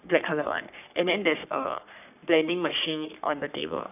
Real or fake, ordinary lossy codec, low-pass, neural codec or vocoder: fake; none; 3.6 kHz; codec, 44.1 kHz, 3.4 kbps, Pupu-Codec